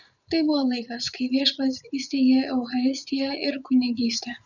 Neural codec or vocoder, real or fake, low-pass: vocoder, 44.1 kHz, 80 mel bands, Vocos; fake; 7.2 kHz